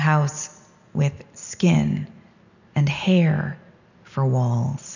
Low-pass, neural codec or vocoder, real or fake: 7.2 kHz; none; real